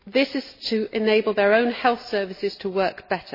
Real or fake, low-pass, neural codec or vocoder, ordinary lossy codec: real; 5.4 kHz; none; MP3, 24 kbps